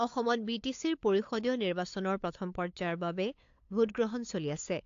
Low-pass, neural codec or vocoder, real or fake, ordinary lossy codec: 7.2 kHz; codec, 16 kHz, 8 kbps, FunCodec, trained on LibriTTS, 25 frames a second; fake; AAC, 48 kbps